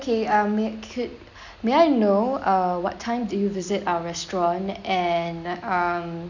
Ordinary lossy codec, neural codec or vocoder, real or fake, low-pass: none; none; real; 7.2 kHz